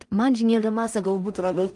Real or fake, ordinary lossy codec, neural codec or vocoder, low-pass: fake; Opus, 32 kbps; codec, 16 kHz in and 24 kHz out, 0.4 kbps, LongCat-Audio-Codec, two codebook decoder; 10.8 kHz